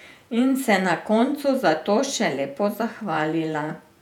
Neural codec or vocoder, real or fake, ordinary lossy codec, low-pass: vocoder, 48 kHz, 128 mel bands, Vocos; fake; none; 19.8 kHz